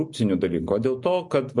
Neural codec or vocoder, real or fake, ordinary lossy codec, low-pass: none; real; MP3, 48 kbps; 10.8 kHz